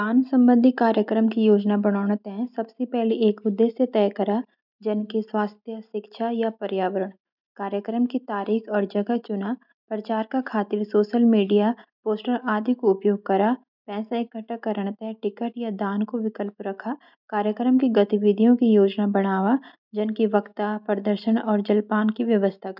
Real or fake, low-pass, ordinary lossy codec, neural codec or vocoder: real; 5.4 kHz; none; none